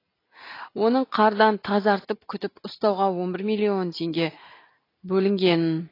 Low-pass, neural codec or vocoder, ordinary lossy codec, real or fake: 5.4 kHz; none; AAC, 24 kbps; real